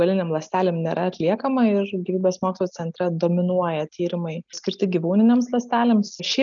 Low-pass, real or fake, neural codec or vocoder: 7.2 kHz; real; none